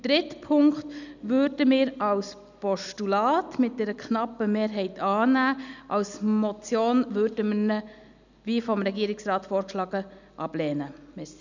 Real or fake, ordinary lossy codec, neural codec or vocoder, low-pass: real; none; none; 7.2 kHz